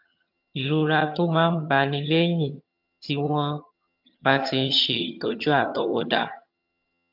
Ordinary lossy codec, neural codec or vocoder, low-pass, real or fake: MP3, 48 kbps; vocoder, 22.05 kHz, 80 mel bands, HiFi-GAN; 5.4 kHz; fake